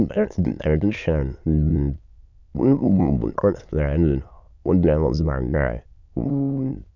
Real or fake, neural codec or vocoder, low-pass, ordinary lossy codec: fake; autoencoder, 22.05 kHz, a latent of 192 numbers a frame, VITS, trained on many speakers; 7.2 kHz; none